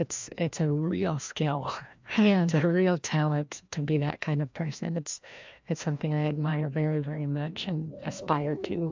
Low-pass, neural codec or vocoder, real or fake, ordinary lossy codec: 7.2 kHz; codec, 16 kHz, 1 kbps, FreqCodec, larger model; fake; MP3, 64 kbps